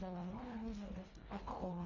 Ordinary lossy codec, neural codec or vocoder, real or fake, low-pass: none; codec, 24 kHz, 1.5 kbps, HILCodec; fake; 7.2 kHz